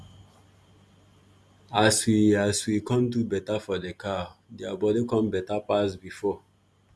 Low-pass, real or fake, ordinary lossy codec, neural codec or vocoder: none; real; none; none